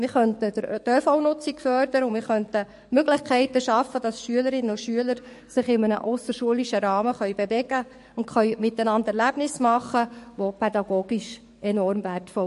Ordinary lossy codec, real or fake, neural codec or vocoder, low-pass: MP3, 48 kbps; fake; codec, 44.1 kHz, 7.8 kbps, DAC; 14.4 kHz